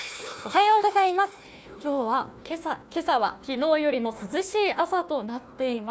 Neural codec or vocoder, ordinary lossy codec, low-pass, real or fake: codec, 16 kHz, 1 kbps, FunCodec, trained on Chinese and English, 50 frames a second; none; none; fake